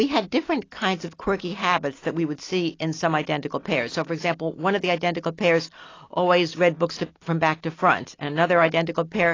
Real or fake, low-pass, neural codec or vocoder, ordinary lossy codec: real; 7.2 kHz; none; AAC, 32 kbps